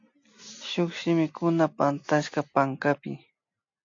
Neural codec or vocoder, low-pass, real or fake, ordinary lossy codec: none; 7.2 kHz; real; AAC, 48 kbps